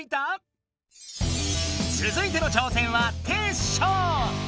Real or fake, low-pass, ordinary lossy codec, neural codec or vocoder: real; none; none; none